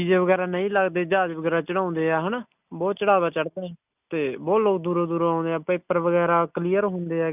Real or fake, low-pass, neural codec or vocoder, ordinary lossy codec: real; 3.6 kHz; none; none